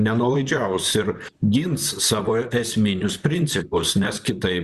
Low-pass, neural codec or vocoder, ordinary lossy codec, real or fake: 14.4 kHz; vocoder, 44.1 kHz, 128 mel bands, Pupu-Vocoder; Opus, 64 kbps; fake